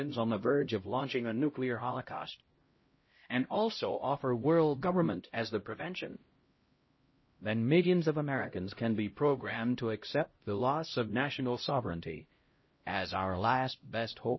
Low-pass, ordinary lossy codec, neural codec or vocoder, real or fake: 7.2 kHz; MP3, 24 kbps; codec, 16 kHz, 0.5 kbps, X-Codec, HuBERT features, trained on LibriSpeech; fake